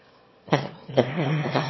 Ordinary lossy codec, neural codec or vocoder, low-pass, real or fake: MP3, 24 kbps; autoencoder, 22.05 kHz, a latent of 192 numbers a frame, VITS, trained on one speaker; 7.2 kHz; fake